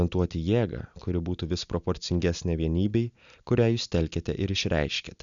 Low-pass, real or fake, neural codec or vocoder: 7.2 kHz; real; none